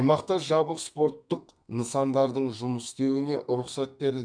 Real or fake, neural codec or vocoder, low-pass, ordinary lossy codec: fake; codec, 32 kHz, 1.9 kbps, SNAC; 9.9 kHz; MP3, 96 kbps